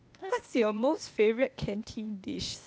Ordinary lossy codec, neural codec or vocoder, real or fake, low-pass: none; codec, 16 kHz, 0.8 kbps, ZipCodec; fake; none